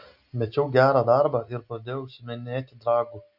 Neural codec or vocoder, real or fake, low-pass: none; real; 5.4 kHz